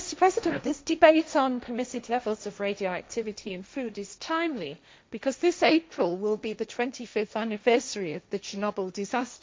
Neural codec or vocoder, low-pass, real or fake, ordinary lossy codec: codec, 16 kHz, 1.1 kbps, Voila-Tokenizer; none; fake; none